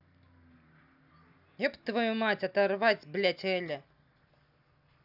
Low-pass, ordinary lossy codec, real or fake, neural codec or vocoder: 5.4 kHz; none; real; none